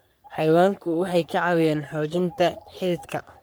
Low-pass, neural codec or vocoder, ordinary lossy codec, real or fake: none; codec, 44.1 kHz, 3.4 kbps, Pupu-Codec; none; fake